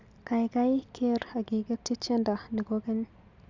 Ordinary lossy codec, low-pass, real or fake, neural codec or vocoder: Opus, 64 kbps; 7.2 kHz; real; none